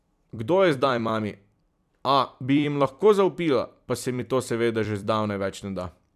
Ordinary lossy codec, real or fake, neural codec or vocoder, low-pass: none; fake; vocoder, 44.1 kHz, 128 mel bands every 256 samples, BigVGAN v2; 14.4 kHz